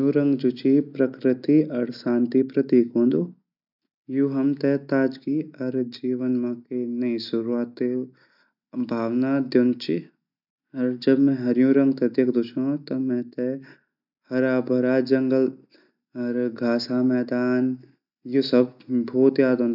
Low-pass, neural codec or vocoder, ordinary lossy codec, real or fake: 5.4 kHz; none; none; real